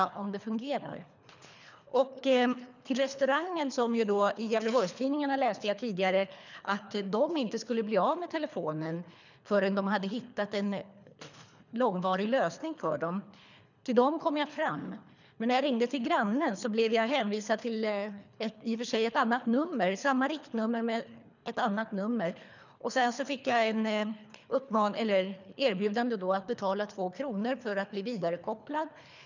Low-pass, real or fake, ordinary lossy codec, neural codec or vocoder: 7.2 kHz; fake; none; codec, 24 kHz, 3 kbps, HILCodec